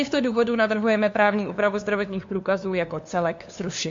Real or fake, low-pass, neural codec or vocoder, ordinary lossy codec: fake; 7.2 kHz; codec, 16 kHz, 2 kbps, FunCodec, trained on LibriTTS, 25 frames a second; MP3, 48 kbps